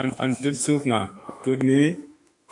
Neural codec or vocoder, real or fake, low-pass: autoencoder, 48 kHz, 32 numbers a frame, DAC-VAE, trained on Japanese speech; fake; 10.8 kHz